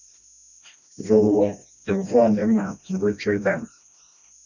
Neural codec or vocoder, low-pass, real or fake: codec, 16 kHz, 1 kbps, FreqCodec, smaller model; 7.2 kHz; fake